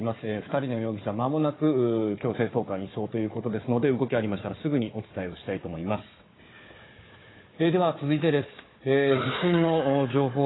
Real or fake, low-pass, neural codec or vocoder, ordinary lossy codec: fake; 7.2 kHz; codec, 16 kHz, 4 kbps, FunCodec, trained on Chinese and English, 50 frames a second; AAC, 16 kbps